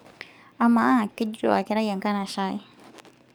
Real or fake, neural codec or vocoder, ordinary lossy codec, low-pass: fake; codec, 44.1 kHz, 7.8 kbps, DAC; none; none